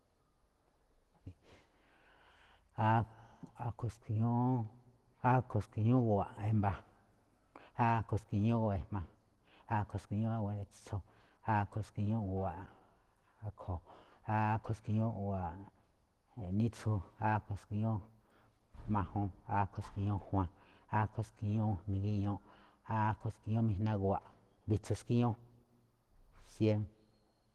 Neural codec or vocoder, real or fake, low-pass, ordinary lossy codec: none; real; 14.4 kHz; Opus, 16 kbps